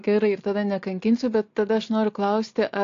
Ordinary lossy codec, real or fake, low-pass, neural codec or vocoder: AAC, 48 kbps; real; 7.2 kHz; none